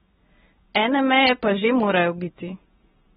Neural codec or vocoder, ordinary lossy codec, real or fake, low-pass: none; AAC, 16 kbps; real; 19.8 kHz